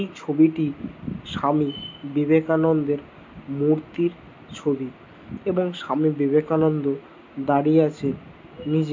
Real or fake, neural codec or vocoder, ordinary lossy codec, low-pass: real; none; MP3, 48 kbps; 7.2 kHz